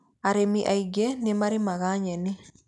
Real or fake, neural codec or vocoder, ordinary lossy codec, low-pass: real; none; none; 10.8 kHz